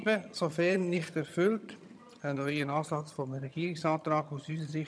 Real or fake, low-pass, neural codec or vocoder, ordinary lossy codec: fake; none; vocoder, 22.05 kHz, 80 mel bands, HiFi-GAN; none